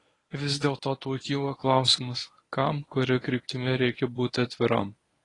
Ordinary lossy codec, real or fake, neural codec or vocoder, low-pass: AAC, 32 kbps; fake; codec, 24 kHz, 0.9 kbps, WavTokenizer, medium speech release version 1; 10.8 kHz